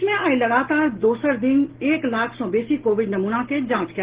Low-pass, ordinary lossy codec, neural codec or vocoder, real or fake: 3.6 kHz; Opus, 16 kbps; none; real